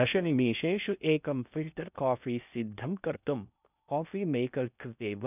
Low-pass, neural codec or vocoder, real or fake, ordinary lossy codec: 3.6 kHz; codec, 16 kHz in and 24 kHz out, 0.6 kbps, FocalCodec, streaming, 2048 codes; fake; none